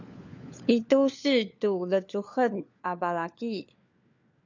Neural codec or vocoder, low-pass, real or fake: codec, 16 kHz, 4 kbps, FunCodec, trained on LibriTTS, 50 frames a second; 7.2 kHz; fake